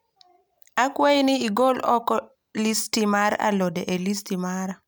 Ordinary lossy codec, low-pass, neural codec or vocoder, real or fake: none; none; none; real